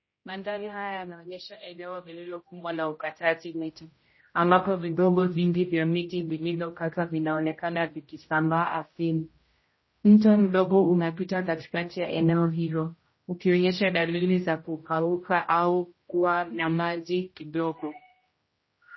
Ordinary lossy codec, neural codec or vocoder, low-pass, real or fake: MP3, 24 kbps; codec, 16 kHz, 0.5 kbps, X-Codec, HuBERT features, trained on general audio; 7.2 kHz; fake